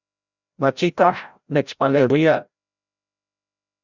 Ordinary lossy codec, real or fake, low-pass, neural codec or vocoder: Opus, 64 kbps; fake; 7.2 kHz; codec, 16 kHz, 0.5 kbps, FreqCodec, larger model